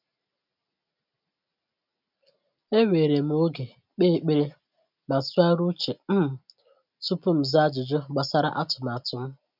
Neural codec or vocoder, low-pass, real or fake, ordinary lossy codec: none; 5.4 kHz; real; none